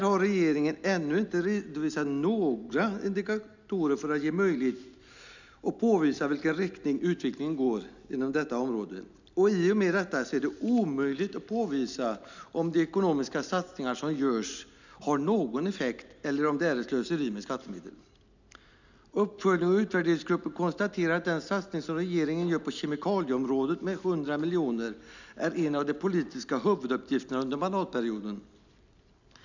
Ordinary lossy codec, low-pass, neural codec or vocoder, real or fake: none; 7.2 kHz; none; real